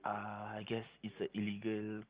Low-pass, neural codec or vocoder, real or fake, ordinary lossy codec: 3.6 kHz; none; real; Opus, 32 kbps